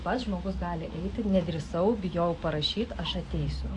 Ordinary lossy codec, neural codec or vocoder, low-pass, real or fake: AAC, 48 kbps; none; 10.8 kHz; real